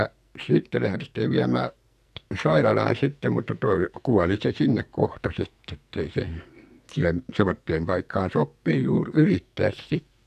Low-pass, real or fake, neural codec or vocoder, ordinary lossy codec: 14.4 kHz; fake; codec, 44.1 kHz, 2.6 kbps, SNAC; none